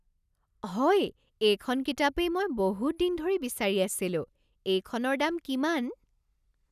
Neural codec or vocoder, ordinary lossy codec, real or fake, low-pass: none; none; real; 14.4 kHz